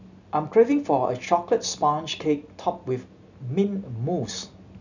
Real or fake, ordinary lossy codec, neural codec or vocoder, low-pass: real; none; none; 7.2 kHz